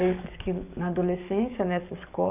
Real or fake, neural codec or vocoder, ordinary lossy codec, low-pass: fake; codec, 44.1 kHz, 7.8 kbps, DAC; none; 3.6 kHz